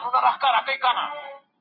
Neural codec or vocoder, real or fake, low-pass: none; real; 5.4 kHz